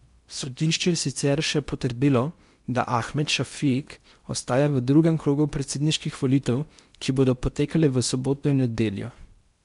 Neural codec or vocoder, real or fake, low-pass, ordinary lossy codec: codec, 16 kHz in and 24 kHz out, 0.8 kbps, FocalCodec, streaming, 65536 codes; fake; 10.8 kHz; MP3, 64 kbps